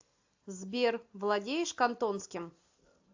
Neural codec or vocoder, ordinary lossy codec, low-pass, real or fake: none; AAC, 48 kbps; 7.2 kHz; real